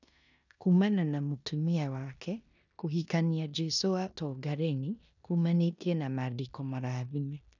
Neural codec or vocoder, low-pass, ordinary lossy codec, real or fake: codec, 16 kHz in and 24 kHz out, 0.9 kbps, LongCat-Audio-Codec, four codebook decoder; 7.2 kHz; none; fake